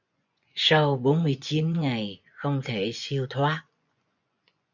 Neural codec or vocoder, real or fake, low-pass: vocoder, 24 kHz, 100 mel bands, Vocos; fake; 7.2 kHz